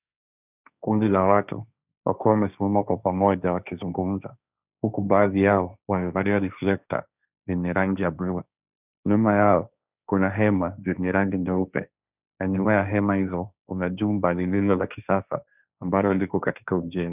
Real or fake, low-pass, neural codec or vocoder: fake; 3.6 kHz; codec, 16 kHz, 1.1 kbps, Voila-Tokenizer